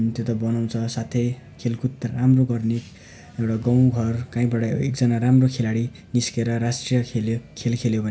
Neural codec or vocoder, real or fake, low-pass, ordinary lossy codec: none; real; none; none